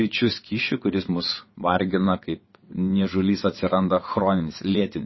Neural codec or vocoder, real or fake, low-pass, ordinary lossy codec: vocoder, 22.05 kHz, 80 mel bands, WaveNeXt; fake; 7.2 kHz; MP3, 24 kbps